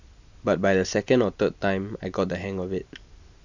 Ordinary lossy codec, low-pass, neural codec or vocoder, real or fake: none; 7.2 kHz; none; real